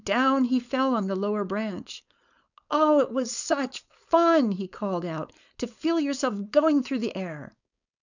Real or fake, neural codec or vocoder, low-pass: fake; codec, 16 kHz, 4.8 kbps, FACodec; 7.2 kHz